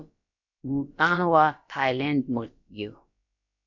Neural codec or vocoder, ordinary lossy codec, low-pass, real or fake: codec, 16 kHz, about 1 kbps, DyCAST, with the encoder's durations; MP3, 64 kbps; 7.2 kHz; fake